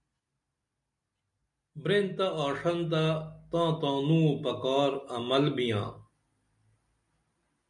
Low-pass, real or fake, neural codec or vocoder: 10.8 kHz; real; none